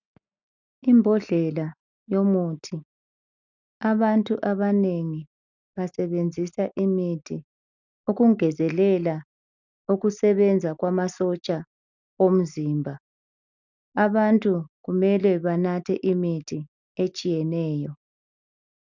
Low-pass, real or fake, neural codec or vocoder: 7.2 kHz; real; none